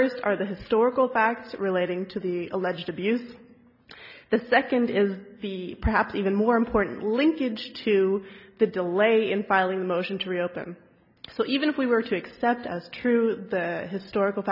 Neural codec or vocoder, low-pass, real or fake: none; 5.4 kHz; real